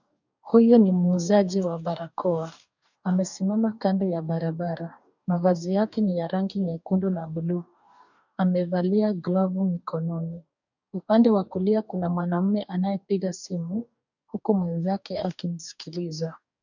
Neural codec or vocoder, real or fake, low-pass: codec, 44.1 kHz, 2.6 kbps, DAC; fake; 7.2 kHz